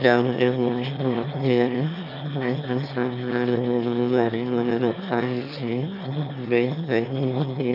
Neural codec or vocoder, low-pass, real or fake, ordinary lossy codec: autoencoder, 22.05 kHz, a latent of 192 numbers a frame, VITS, trained on one speaker; 5.4 kHz; fake; none